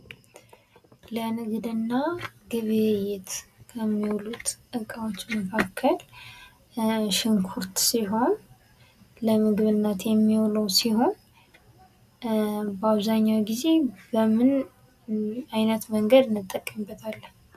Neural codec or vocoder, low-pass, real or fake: none; 14.4 kHz; real